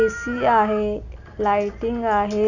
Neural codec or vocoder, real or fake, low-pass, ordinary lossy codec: none; real; 7.2 kHz; none